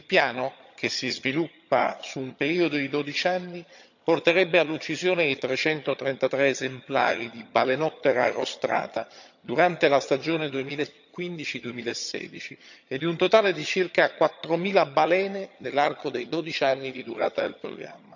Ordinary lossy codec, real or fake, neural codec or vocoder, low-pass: none; fake; vocoder, 22.05 kHz, 80 mel bands, HiFi-GAN; 7.2 kHz